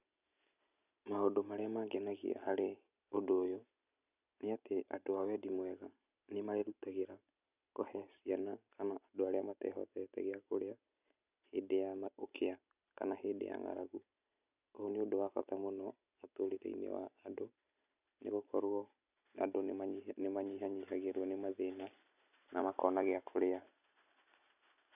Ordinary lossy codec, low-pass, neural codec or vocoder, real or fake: none; 3.6 kHz; none; real